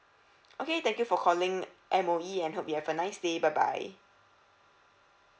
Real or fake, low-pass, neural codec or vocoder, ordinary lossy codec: real; none; none; none